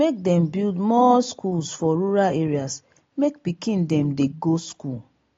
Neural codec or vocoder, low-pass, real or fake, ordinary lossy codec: none; 7.2 kHz; real; AAC, 32 kbps